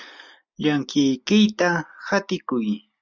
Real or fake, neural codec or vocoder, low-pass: real; none; 7.2 kHz